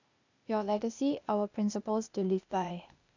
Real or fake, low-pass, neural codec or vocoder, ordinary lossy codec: fake; 7.2 kHz; codec, 16 kHz, 0.8 kbps, ZipCodec; none